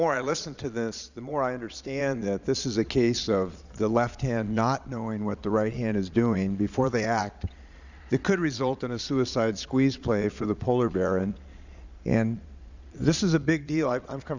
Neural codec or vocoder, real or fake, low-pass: vocoder, 22.05 kHz, 80 mel bands, Vocos; fake; 7.2 kHz